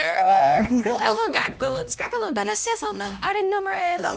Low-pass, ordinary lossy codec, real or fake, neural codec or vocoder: none; none; fake; codec, 16 kHz, 1 kbps, X-Codec, HuBERT features, trained on LibriSpeech